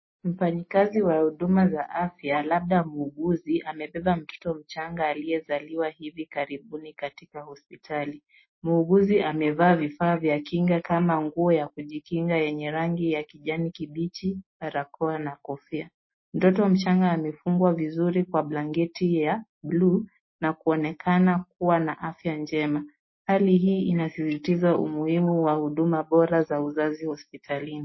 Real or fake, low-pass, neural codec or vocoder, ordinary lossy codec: real; 7.2 kHz; none; MP3, 24 kbps